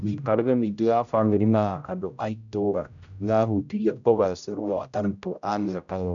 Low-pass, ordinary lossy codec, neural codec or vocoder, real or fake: 7.2 kHz; none; codec, 16 kHz, 0.5 kbps, X-Codec, HuBERT features, trained on general audio; fake